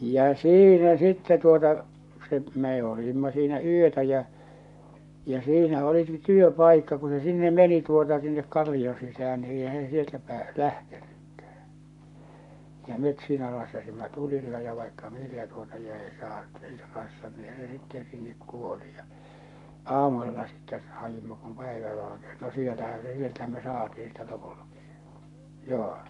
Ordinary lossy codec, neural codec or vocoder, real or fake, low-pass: none; codec, 44.1 kHz, 7.8 kbps, Pupu-Codec; fake; 10.8 kHz